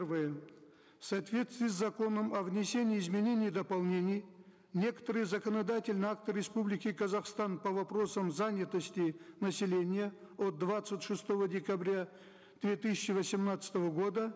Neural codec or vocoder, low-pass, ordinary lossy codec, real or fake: none; none; none; real